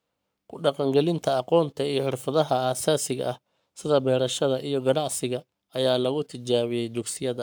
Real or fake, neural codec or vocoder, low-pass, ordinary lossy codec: fake; codec, 44.1 kHz, 7.8 kbps, Pupu-Codec; none; none